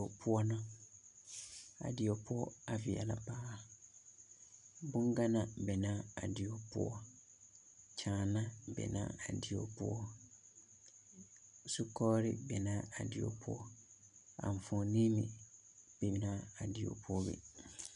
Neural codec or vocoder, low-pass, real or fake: none; 10.8 kHz; real